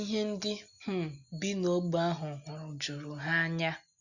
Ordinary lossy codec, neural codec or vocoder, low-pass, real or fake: none; none; 7.2 kHz; real